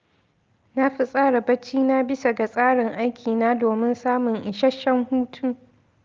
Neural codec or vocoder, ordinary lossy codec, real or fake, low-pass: none; Opus, 32 kbps; real; 7.2 kHz